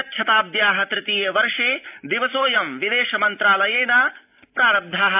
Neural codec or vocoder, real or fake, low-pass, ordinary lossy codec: none; real; 3.6 kHz; AAC, 32 kbps